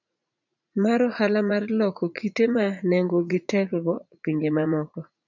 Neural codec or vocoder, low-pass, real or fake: vocoder, 44.1 kHz, 80 mel bands, Vocos; 7.2 kHz; fake